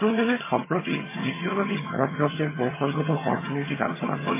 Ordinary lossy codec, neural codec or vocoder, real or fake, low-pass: MP3, 16 kbps; vocoder, 22.05 kHz, 80 mel bands, HiFi-GAN; fake; 3.6 kHz